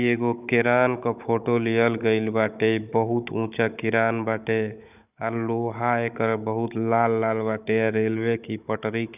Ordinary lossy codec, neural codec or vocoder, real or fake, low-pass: none; codec, 16 kHz, 16 kbps, FunCodec, trained on Chinese and English, 50 frames a second; fake; 3.6 kHz